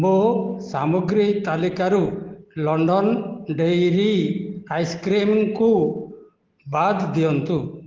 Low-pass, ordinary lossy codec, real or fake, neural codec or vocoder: 7.2 kHz; Opus, 16 kbps; real; none